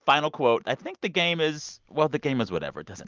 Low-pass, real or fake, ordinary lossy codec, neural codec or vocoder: 7.2 kHz; real; Opus, 24 kbps; none